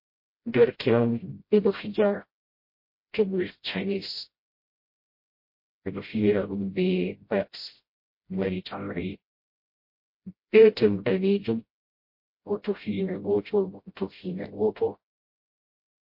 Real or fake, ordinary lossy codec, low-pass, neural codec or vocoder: fake; MP3, 32 kbps; 5.4 kHz; codec, 16 kHz, 0.5 kbps, FreqCodec, smaller model